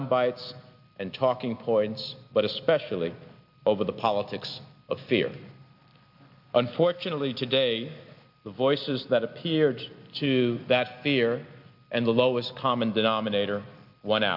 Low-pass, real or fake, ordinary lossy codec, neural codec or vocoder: 5.4 kHz; real; MP3, 48 kbps; none